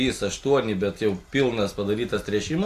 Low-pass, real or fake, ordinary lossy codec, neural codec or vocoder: 14.4 kHz; real; AAC, 64 kbps; none